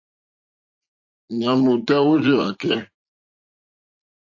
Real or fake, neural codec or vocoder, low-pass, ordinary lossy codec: fake; vocoder, 44.1 kHz, 80 mel bands, Vocos; 7.2 kHz; AAC, 48 kbps